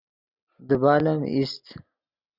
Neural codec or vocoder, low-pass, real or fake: none; 5.4 kHz; real